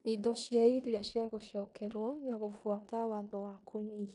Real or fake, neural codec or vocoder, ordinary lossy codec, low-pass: fake; codec, 16 kHz in and 24 kHz out, 0.9 kbps, LongCat-Audio-Codec, four codebook decoder; none; 10.8 kHz